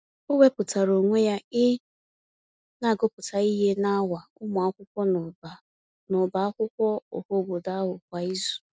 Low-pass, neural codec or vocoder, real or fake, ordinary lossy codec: none; none; real; none